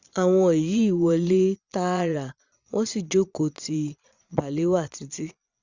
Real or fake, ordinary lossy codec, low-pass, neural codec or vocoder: fake; Opus, 64 kbps; 7.2 kHz; vocoder, 22.05 kHz, 80 mel bands, Vocos